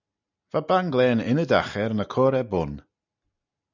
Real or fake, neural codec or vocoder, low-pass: real; none; 7.2 kHz